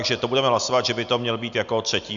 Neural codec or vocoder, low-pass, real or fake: none; 7.2 kHz; real